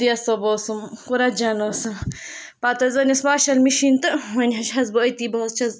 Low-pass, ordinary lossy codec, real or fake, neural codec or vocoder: none; none; real; none